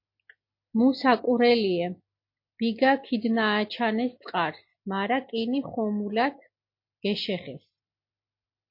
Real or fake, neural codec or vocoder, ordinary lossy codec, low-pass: real; none; MP3, 32 kbps; 5.4 kHz